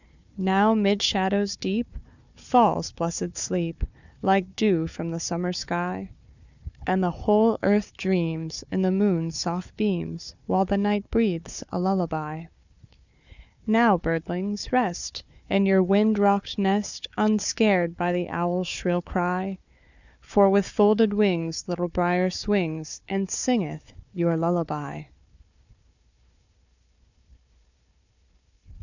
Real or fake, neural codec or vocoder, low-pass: fake; codec, 16 kHz, 4 kbps, FunCodec, trained on Chinese and English, 50 frames a second; 7.2 kHz